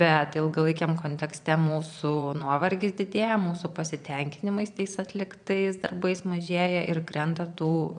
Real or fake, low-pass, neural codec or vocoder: fake; 9.9 kHz; vocoder, 22.05 kHz, 80 mel bands, Vocos